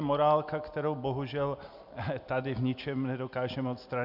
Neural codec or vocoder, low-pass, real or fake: none; 5.4 kHz; real